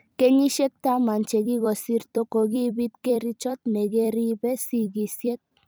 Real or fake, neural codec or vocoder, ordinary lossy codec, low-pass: fake; vocoder, 44.1 kHz, 128 mel bands every 256 samples, BigVGAN v2; none; none